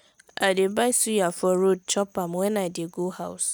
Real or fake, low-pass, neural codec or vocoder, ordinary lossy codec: real; none; none; none